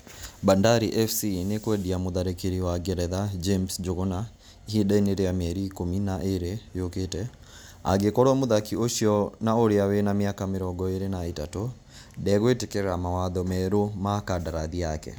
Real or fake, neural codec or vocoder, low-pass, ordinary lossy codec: real; none; none; none